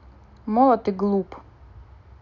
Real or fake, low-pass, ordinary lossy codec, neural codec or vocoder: real; 7.2 kHz; none; none